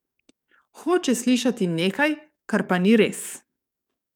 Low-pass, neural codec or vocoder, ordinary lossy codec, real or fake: 19.8 kHz; codec, 44.1 kHz, 7.8 kbps, DAC; none; fake